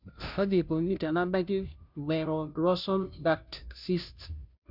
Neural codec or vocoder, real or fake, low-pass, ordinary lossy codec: codec, 16 kHz, 0.5 kbps, FunCodec, trained on Chinese and English, 25 frames a second; fake; 5.4 kHz; none